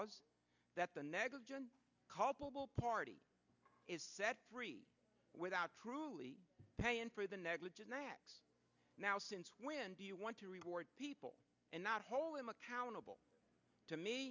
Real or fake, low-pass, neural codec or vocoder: real; 7.2 kHz; none